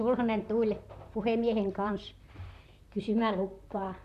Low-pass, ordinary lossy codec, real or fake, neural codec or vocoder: 14.4 kHz; none; fake; vocoder, 44.1 kHz, 128 mel bands, Pupu-Vocoder